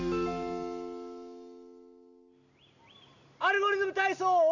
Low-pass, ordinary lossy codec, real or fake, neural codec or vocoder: 7.2 kHz; none; real; none